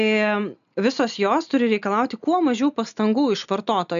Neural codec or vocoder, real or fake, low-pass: none; real; 7.2 kHz